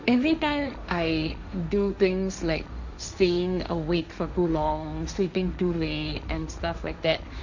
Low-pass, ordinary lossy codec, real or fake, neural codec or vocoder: 7.2 kHz; none; fake; codec, 16 kHz, 1.1 kbps, Voila-Tokenizer